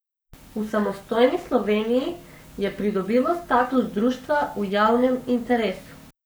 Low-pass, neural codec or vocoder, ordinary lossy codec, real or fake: none; codec, 44.1 kHz, 7.8 kbps, Pupu-Codec; none; fake